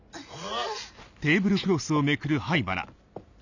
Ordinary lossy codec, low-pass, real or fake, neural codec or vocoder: none; 7.2 kHz; real; none